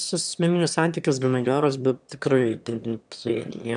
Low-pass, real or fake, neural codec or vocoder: 9.9 kHz; fake; autoencoder, 22.05 kHz, a latent of 192 numbers a frame, VITS, trained on one speaker